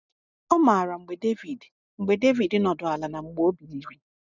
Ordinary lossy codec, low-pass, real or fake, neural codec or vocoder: none; 7.2 kHz; real; none